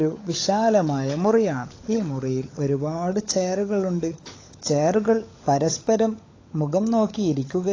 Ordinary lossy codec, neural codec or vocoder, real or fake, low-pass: AAC, 32 kbps; codec, 16 kHz, 16 kbps, FunCodec, trained on LibriTTS, 50 frames a second; fake; 7.2 kHz